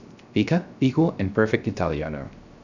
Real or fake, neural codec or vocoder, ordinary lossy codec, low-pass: fake; codec, 16 kHz, 0.3 kbps, FocalCodec; none; 7.2 kHz